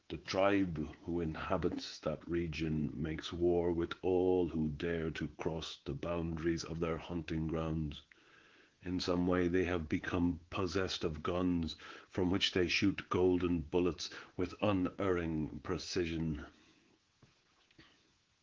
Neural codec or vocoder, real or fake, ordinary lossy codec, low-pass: codec, 24 kHz, 3.1 kbps, DualCodec; fake; Opus, 16 kbps; 7.2 kHz